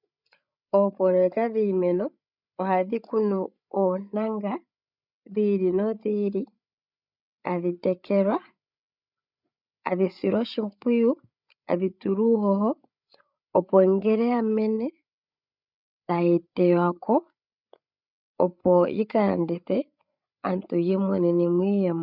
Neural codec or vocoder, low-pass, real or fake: codec, 16 kHz, 8 kbps, FreqCodec, larger model; 5.4 kHz; fake